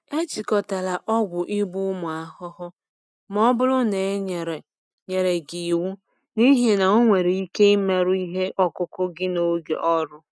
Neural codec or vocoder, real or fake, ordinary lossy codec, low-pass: none; real; none; none